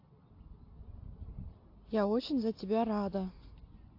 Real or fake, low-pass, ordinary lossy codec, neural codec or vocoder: real; 5.4 kHz; AAC, 32 kbps; none